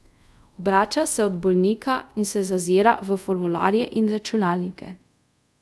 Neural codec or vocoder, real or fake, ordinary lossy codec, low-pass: codec, 24 kHz, 0.5 kbps, DualCodec; fake; none; none